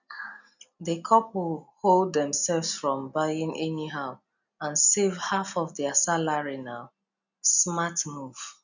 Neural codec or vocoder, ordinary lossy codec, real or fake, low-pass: none; none; real; 7.2 kHz